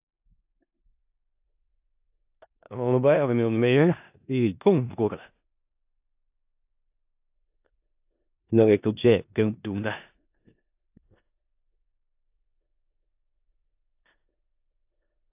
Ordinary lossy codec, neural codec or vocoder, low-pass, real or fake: AAC, 32 kbps; codec, 16 kHz in and 24 kHz out, 0.4 kbps, LongCat-Audio-Codec, four codebook decoder; 3.6 kHz; fake